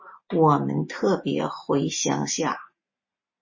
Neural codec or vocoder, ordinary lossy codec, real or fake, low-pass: none; MP3, 32 kbps; real; 7.2 kHz